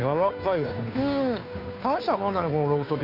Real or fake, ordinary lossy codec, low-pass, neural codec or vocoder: fake; none; 5.4 kHz; codec, 16 kHz, 2 kbps, FunCodec, trained on Chinese and English, 25 frames a second